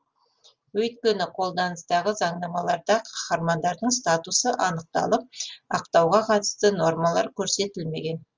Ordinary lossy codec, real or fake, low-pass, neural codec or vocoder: Opus, 32 kbps; real; 7.2 kHz; none